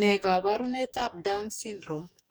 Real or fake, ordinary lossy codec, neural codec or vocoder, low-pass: fake; none; codec, 44.1 kHz, 2.6 kbps, DAC; none